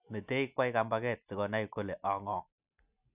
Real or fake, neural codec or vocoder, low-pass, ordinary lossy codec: real; none; 3.6 kHz; none